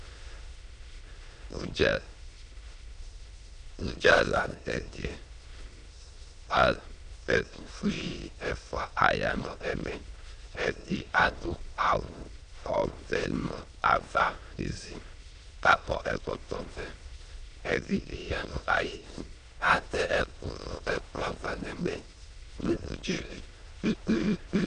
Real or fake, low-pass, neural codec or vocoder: fake; 9.9 kHz; autoencoder, 22.05 kHz, a latent of 192 numbers a frame, VITS, trained on many speakers